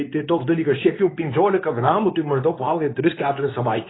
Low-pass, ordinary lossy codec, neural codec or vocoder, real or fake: 7.2 kHz; AAC, 16 kbps; codec, 24 kHz, 0.9 kbps, WavTokenizer, medium speech release version 2; fake